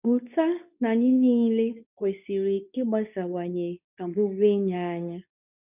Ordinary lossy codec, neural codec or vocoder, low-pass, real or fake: none; codec, 24 kHz, 0.9 kbps, WavTokenizer, medium speech release version 2; 3.6 kHz; fake